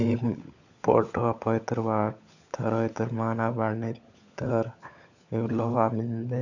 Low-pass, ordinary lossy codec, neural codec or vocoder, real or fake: 7.2 kHz; none; codec, 16 kHz, 16 kbps, FunCodec, trained on LibriTTS, 50 frames a second; fake